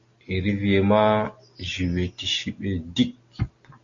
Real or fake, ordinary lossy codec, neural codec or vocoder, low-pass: real; Opus, 64 kbps; none; 7.2 kHz